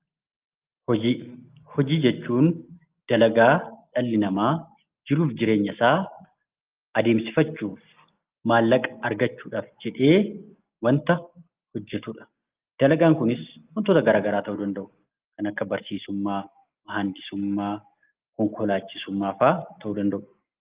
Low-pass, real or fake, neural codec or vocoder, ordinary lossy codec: 3.6 kHz; real; none; Opus, 32 kbps